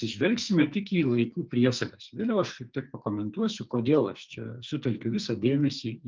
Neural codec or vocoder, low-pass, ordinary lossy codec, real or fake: codec, 44.1 kHz, 2.6 kbps, SNAC; 7.2 kHz; Opus, 24 kbps; fake